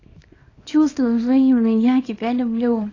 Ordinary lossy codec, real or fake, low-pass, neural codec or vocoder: AAC, 32 kbps; fake; 7.2 kHz; codec, 24 kHz, 0.9 kbps, WavTokenizer, small release